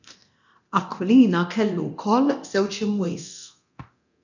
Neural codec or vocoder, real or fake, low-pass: codec, 24 kHz, 0.9 kbps, DualCodec; fake; 7.2 kHz